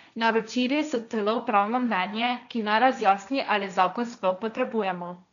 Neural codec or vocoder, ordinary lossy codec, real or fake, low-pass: codec, 16 kHz, 1.1 kbps, Voila-Tokenizer; none; fake; 7.2 kHz